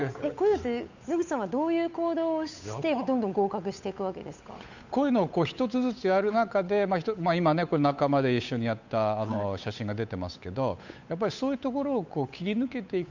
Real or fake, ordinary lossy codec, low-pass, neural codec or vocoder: fake; none; 7.2 kHz; codec, 16 kHz, 8 kbps, FunCodec, trained on Chinese and English, 25 frames a second